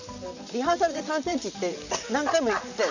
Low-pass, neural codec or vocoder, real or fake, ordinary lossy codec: 7.2 kHz; vocoder, 44.1 kHz, 128 mel bands, Pupu-Vocoder; fake; none